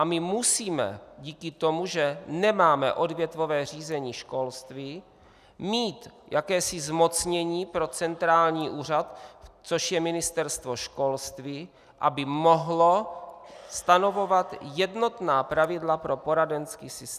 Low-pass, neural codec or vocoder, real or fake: 14.4 kHz; none; real